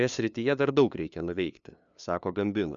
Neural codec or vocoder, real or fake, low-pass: codec, 16 kHz, 2 kbps, FunCodec, trained on LibriTTS, 25 frames a second; fake; 7.2 kHz